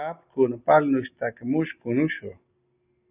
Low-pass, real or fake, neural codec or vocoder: 3.6 kHz; real; none